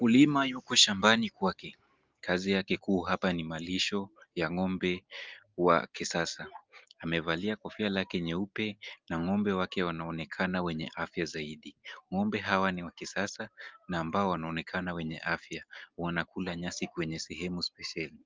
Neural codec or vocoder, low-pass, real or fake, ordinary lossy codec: none; 7.2 kHz; real; Opus, 24 kbps